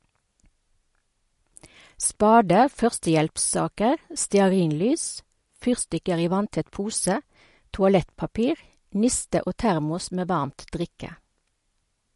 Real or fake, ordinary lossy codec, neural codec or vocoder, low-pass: real; MP3, 48 kbps; none; 14.4 kHz